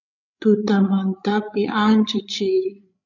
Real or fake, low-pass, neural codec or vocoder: fake; 7.2 kHz; codec, 16 kHz, 8 kbps, FreqCodec, larger model